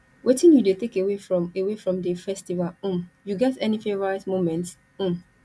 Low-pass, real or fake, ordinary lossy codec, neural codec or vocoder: none; real; none; none